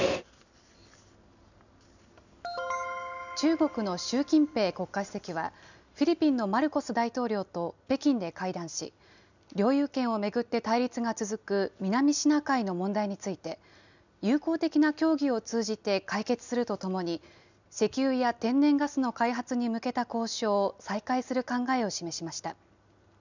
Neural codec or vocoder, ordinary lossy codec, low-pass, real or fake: none; none; 7.2 kHz; real